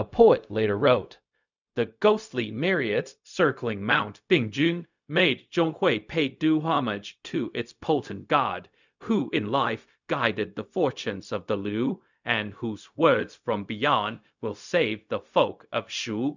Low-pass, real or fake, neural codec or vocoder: 7.2 kHz; fake; codec, 16 kHz, 0.4 kbps, LongCat-Audio-Codec